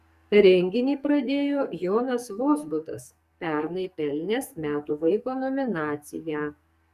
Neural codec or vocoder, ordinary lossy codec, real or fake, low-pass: codec, 44.1 kHz, 2.6 kbps, SNAC; Opus, 64 kbps; fake; 14.4 kHz